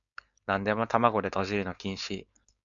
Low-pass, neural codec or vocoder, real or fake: 7.2 kHz; codec, 16 kHz, 4.8 kbps, FACodec; fake